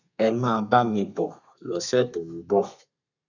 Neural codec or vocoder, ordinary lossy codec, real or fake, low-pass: codec, 32 kHz, 1.9 kbps, SNAC; none; fake; 7.2 kHz